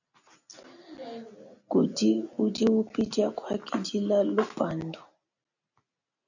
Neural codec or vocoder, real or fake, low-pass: none; real; 7.2 kHz